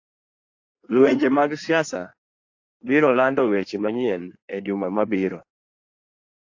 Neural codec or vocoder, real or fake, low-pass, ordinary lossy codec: codec, 16 kHz in and 24 kHz out, 1.1 kbps, FireRedTTS-2 codec; fake; 7.2 kHz; AAC, 48 kbps